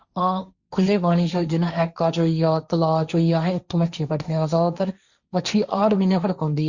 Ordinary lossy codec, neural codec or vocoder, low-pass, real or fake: Opus, 64 kbps; codec, 16 kHz, 1.1 kbps, Voila-Tokenizer; 7.2 kHz; fake